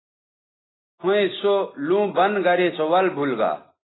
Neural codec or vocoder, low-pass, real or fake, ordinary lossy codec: none; 7.2 kHz; real; AAC, 16 kbps